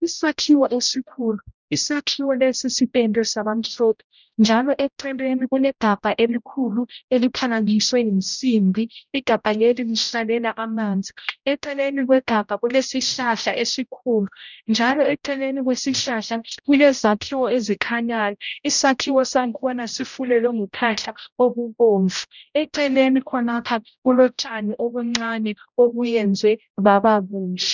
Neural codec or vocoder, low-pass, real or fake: codec, 16 kHz, 0.5 kbps, X-Codec, HuBERT features, trained on general audio; 7.2 kHz; fake